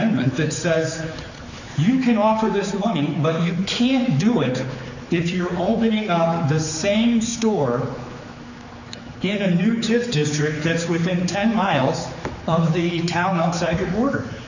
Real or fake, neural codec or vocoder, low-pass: fake; codec, 16 kHz, 4 kbps, X-Codec, HuBERT features, trained on general audio; 7.2 kHz